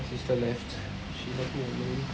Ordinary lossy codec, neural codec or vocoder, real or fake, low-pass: none; none; real; none